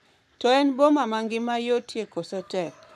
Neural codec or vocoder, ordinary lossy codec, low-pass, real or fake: codec, 44.1 kHz, 7.8 kbps, Pupu-Codec; none; 14.4 kHz; fake